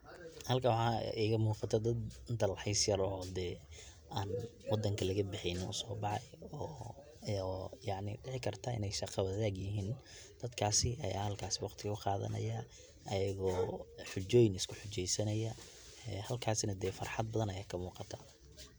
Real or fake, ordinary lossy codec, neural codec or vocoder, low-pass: real; none; none; none